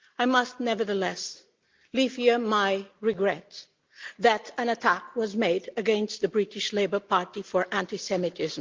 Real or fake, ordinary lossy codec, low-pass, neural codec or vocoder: real; Opus, 32 kbps; 7.2 kHz; none